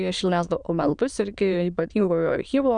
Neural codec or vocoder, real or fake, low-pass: autoencoder, 22.05 kHz, a latent of 192 numbers a frame, VITS, trained on many speakers; fake; 9.9 kHz